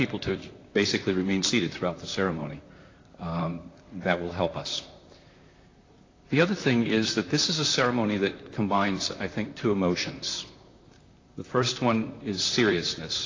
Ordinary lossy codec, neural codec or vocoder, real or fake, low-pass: AAC, 32 kbps; vocoder, 44.1 kHz, 128 mel bands, Pupu-Vocoder; fake; 7.2 kHz